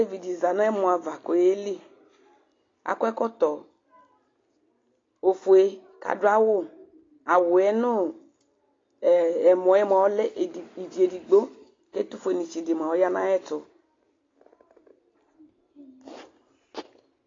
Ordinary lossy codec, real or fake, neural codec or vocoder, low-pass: AAC, 32 kbps; real; none; 7.2 kHz